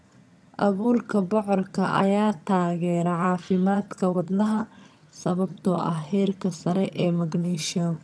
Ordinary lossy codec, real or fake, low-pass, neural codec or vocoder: none; fake; none; vocoder, 22.05 kHz, 80 mel bands, HiFi-GAN